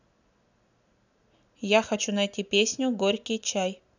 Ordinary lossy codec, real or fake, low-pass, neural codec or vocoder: none; real; 7.2 kHz; none